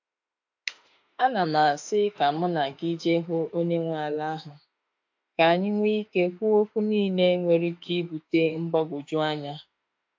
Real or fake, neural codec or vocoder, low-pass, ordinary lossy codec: fake; autoencoder, 48 kHz, 32 numbers a frame, DAC-VAE, trained on Japanese speech; 7.2 kHz; AAC, 48 kbps